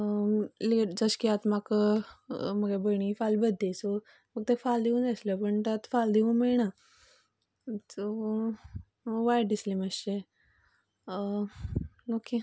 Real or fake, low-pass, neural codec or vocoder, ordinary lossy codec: real; none; none; none